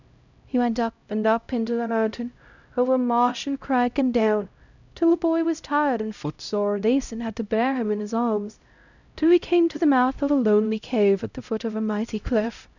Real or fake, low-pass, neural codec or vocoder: fake; 7.2 kHz; codec, 16 kHz, 0.5 kbps, X-Codec, HuBERT features, trained on LibriSpeech